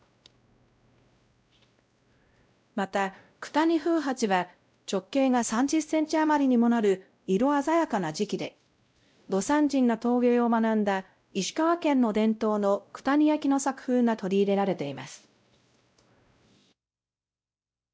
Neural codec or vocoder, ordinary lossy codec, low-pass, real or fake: codec, 16 kHz, 0.5 kbps, X-Codec, WavLM features, trained on Multilingual LibriSpeech; none; none; fake